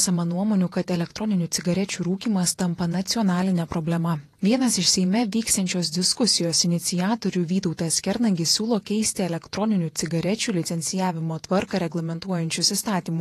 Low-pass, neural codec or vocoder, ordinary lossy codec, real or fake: 14.4 kHz; vocoder, 48 kHz, 128 mel bands, Vocos; AAC, 48 kbps; fake